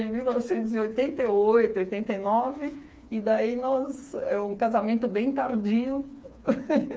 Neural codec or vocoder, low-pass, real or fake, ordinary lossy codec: codec, 16 kHz, 4 kbps, FreqCodec, smaller model; none; fake; none